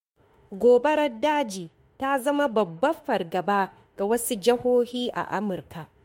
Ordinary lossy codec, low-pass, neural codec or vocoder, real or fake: MP3, 64 kbps; 19.8 kHz; autoencoder, 48 kHz, 32 numbers a frame, DAC-VAE, trained on Japanese speech; fake